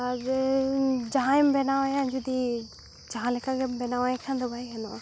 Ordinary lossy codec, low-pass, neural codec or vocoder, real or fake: none; none; none; real